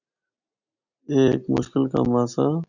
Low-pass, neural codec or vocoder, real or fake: 7.2 kHz; none; real